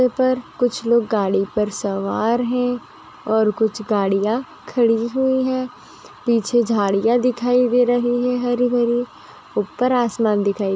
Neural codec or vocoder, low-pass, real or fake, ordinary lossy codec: none; none; real; none